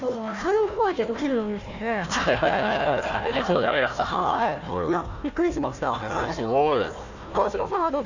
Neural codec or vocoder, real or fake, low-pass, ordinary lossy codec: codec, 16 kHz, 1 kbps, FunCodec, trained on Chinese and English, 50 frames a second; fake; 7.2 kHz; none